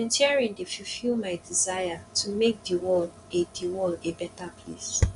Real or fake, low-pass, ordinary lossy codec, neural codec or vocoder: fake; 10.8 kHz; none; vocoder, 24 kHz, 100 mel bands, Vocos